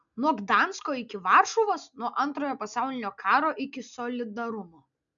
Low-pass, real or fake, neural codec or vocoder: 7.2 kHz; real; none